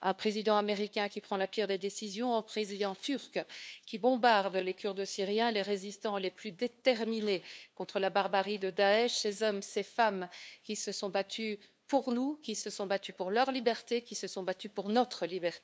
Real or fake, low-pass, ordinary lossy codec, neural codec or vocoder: fake; none; none; codec, 16 kHz, 2 kbps, FunCodec, trained on LibriTTS, 25 frames a second